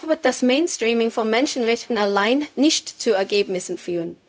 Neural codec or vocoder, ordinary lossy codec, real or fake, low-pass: codec, 16 kHz, 0.4 kbps, LongCat-Audio-Codec; none; fake; none